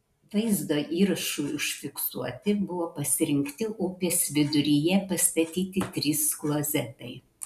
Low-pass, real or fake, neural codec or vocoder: 14.4 kHz; real; none